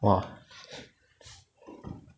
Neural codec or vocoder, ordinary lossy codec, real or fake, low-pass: none; none; real; none